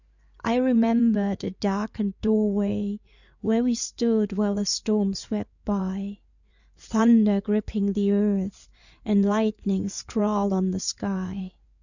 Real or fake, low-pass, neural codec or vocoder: fake; 7.2 kHz; codec, 16 kHz in and 24 kHz out, 2.2 kbps, FireRedTTS-2 codec